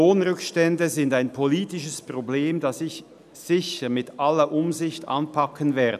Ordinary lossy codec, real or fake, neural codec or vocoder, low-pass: none; real; none; 14.4 kHz